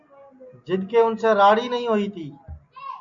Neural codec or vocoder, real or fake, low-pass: none; real; 7.2 kHz